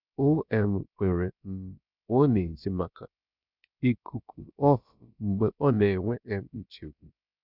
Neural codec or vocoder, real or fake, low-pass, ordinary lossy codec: codec, 16 kHz, about 1 kbps, DyCAST, with the encoder's durations; fake; 5.4 kHz; none